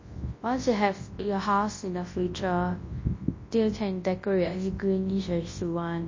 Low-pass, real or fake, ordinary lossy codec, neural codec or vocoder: 7.2 kHz; fake; MP3, 32 kbps; codec, 24 kHz, 0.9 kbps, WavTokenizer, large speech release